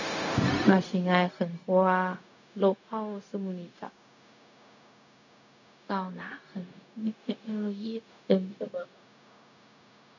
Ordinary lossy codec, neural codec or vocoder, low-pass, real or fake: none; codec, 16 kHz, 0.4 kbps, LongCat-Audio-Codec; 7.2 kHz; fake